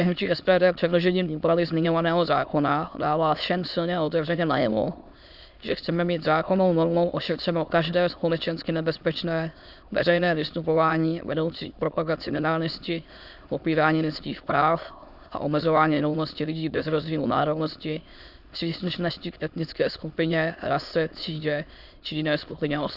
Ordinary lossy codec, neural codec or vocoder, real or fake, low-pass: Opus, 64 kbps; autoencoder, 22.05 kHz, a latent of 192 numbers a frame, VITS, trained on many speakers; fake; 5.4 kHz